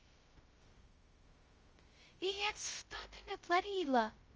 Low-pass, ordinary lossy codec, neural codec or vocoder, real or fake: 7.2 kHz; Opus, 24 kbps; codec, 16 kHz, 0.2 kbps, FocalCodec; fake